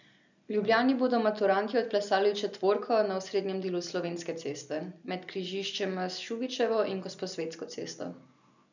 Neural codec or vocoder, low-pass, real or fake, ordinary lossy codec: none; 7.2 kHz; real; none